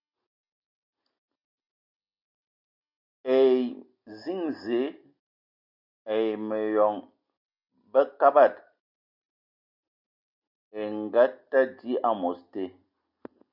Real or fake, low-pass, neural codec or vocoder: real; 5.4 kHz; none